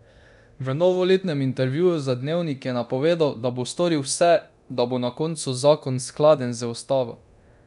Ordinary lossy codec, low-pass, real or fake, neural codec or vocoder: none; 10.8 kHz; fake; codec, 24 kHz, 0.9 kbps, DualCodec